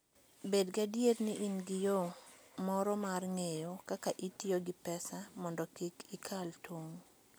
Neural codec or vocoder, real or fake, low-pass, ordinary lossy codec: none; real; none; none